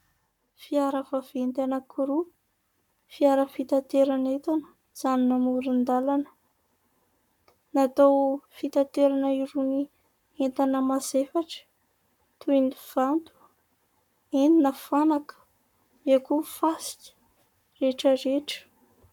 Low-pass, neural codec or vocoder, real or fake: 19.8 kHz; codec, 44.1 kHz, 7.8 kbps, Pupu-Codec; fake